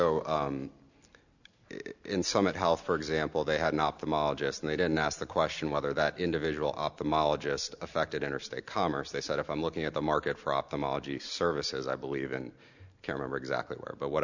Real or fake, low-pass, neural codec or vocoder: real; 7.2 kHz; none